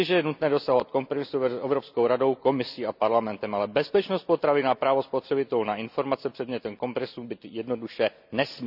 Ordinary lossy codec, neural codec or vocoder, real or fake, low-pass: none; none; real; 5.4 kHz